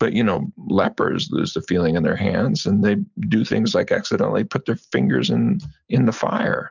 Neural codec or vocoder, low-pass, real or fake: none; 7.2 kHz; real